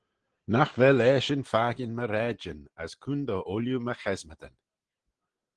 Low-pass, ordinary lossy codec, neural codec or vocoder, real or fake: 9.9 kHz; Opus, 16 kbps; none; real